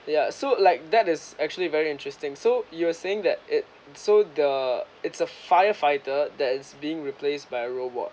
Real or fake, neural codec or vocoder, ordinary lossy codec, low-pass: real; none; none; none